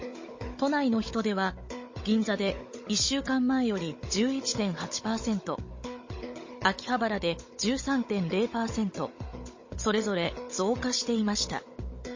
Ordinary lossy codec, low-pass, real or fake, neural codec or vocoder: MP3, 32 kbps; 7.2 kHz; fake; codec, 16 kHz, 16 kbps, FunCodec, trained on Chinese and English, 50 frames a second